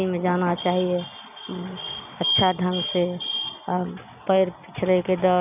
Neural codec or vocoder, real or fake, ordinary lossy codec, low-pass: none; real; none; 3.6 kHz